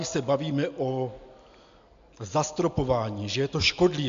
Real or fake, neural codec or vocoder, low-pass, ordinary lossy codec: real; none; 7.2 kHz; AAC, 64 kbps